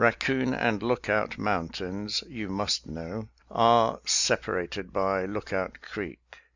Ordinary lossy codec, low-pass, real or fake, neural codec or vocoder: Opus, 64 kbps; 7.2 kHz; real; none